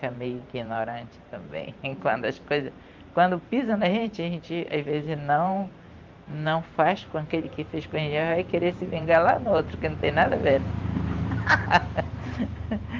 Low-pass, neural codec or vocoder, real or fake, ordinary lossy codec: 7.2 kHz; vocoder, 44.1 kHz, 128 mel bands every 512 samples, BigVGAN v2; fake; Opus, 32 kbps